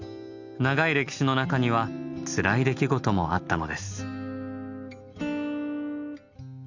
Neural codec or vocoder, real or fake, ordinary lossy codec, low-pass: none; real; none; 7.2 kHz